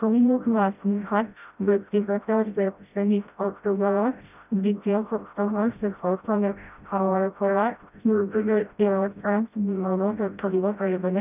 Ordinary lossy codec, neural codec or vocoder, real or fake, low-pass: none; codec, 16 kHz, 0.5 kbps, FreqCodec, smaller model; fake; 3.6 kHz